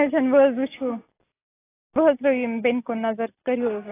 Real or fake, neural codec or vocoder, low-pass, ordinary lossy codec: real; none; 3.6 kHz; AAC, 16 kbps